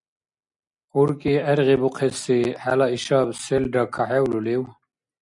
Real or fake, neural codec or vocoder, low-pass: real; none; 10.8 kHz